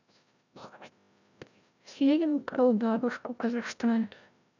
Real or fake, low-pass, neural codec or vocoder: fake; 7.2 kHz; codec, 16 kHz, 0.5 kbps, FreqCodec, larger model